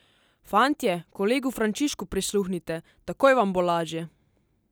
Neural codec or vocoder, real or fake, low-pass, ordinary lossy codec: none; real; none; none